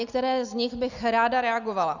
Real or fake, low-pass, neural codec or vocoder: real; 7.2 kHz; none